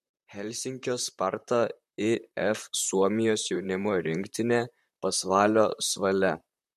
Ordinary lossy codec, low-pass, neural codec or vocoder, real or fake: MP3, 64 kbps; 14.4 kHz; vocoder, 44.1 kHz, 128 mel bands, Pupu-Vocoder; fake